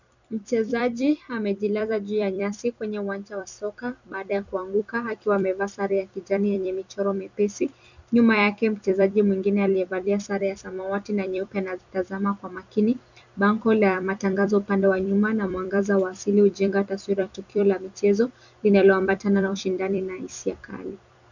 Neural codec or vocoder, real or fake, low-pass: vocoder, 44.1 kHz, 128 mel bands every 256 samples, BigVGAN v2; fake; 7.2 kHz